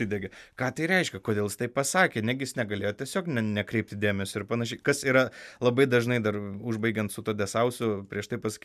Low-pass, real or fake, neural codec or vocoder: 14.4 kHz; real; none